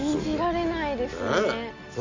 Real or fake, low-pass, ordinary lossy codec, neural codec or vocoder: real; 7.2 kHz; AAC, 48 kbps; none